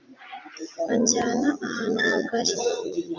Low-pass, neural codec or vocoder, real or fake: 7.2 kHz; vocoder, 44.1 kHz, 80 mel bands, Vocos; fake